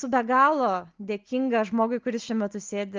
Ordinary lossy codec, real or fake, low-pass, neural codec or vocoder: Opus, 24 kbps; real; 7.2 kHz; none